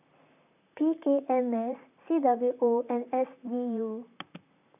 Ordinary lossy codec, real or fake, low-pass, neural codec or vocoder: none; fake; 3.6 kHz; vocoder, 44.1 kHz, 128 mel bands, Pupu-Vocoder